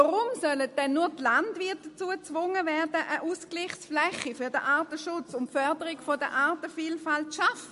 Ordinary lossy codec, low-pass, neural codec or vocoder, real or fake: MP3, 48 kbps; 14.4 kHz; none; real